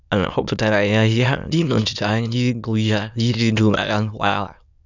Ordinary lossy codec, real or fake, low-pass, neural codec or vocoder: none; fake; 7.2 kHz; autoencoder, 22.05 kHz, a latent of 192 numbers a frame, VITS, trained on many speakers